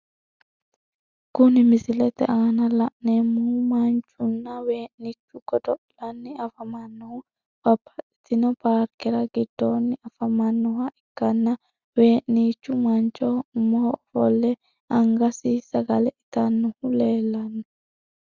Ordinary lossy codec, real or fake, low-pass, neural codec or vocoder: Opus, 64 kbps; real; 7.2 kHz; none